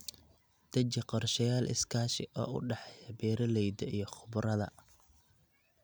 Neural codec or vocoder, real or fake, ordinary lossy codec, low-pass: none; real; none; none